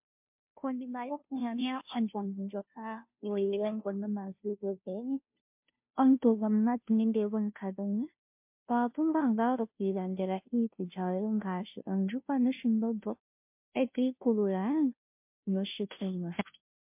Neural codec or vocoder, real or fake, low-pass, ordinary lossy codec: codec, 16 kHz, 0.5 kbps, FunCodec, trained on Chinese and English, 25 frames a second; fake; 3.6 kHz; MP3, 32 kbps